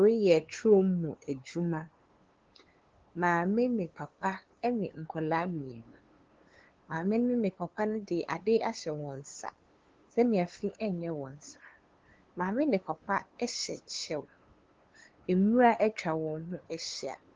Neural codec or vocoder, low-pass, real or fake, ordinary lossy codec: codec, 16 kHz, 2 kbps, FunCodec, trained on LibriTTS, 25 frames a second; 7.2 kHz; fake; Opus, 16 kbps